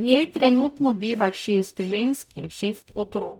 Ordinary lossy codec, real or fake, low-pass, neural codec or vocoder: none; fake; 19.8 kHz; codec, 44.1 kHz, 0.9 kbps, DAC